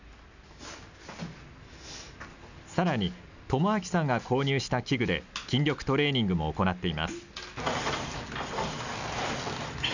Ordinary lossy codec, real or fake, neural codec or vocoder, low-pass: none; real; none; 7.2 kHz